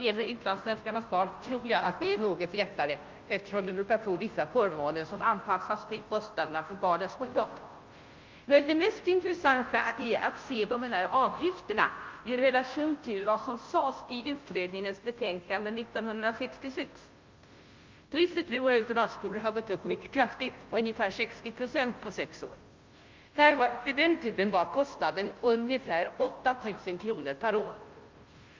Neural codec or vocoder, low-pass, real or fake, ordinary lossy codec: codec, 16 kHz, 0.5 kbps, FunCodec, trained on Chinese and English, 25 frames a second; 7.2 kHz; fake; Opus, 24 kbps